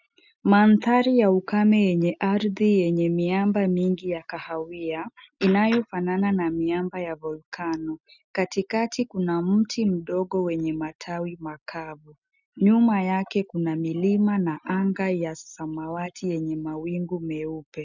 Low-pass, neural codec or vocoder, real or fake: 7.2 kHz; none; real